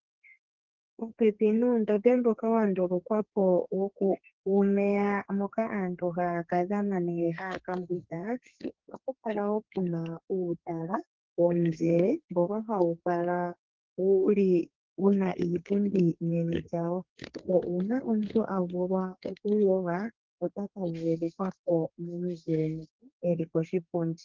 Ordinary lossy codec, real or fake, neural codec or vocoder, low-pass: Opus, 16 kbps; fake; codec, 32 kHz, 1.9 kbps, SNAC; 7.2 kHz